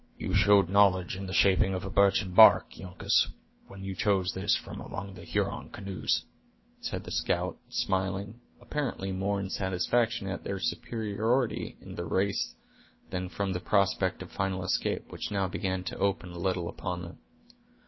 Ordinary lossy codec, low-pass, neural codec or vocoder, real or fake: MP3, 24 kbps; 7.2 kHz; autoencoder, 48 kHz, 128 numbers a frame, DAC-VAE, trained on Japanese speech; fake